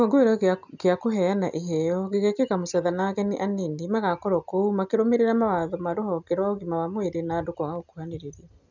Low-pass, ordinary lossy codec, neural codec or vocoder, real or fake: 7.2 kHz; none; none; real